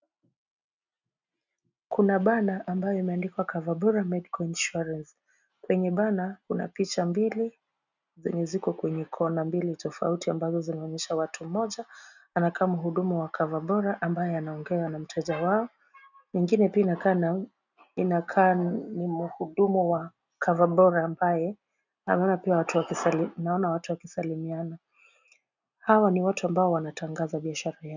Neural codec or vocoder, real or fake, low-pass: none; real; 7.2 kHz